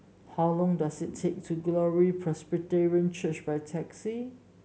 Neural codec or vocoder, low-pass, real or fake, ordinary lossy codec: none; none; real; none